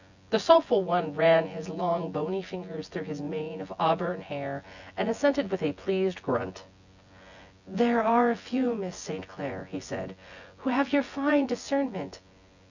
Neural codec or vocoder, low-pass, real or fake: vocoder, 24 kHz, 100 mel bands, Vocos; 7.2 kHz; fake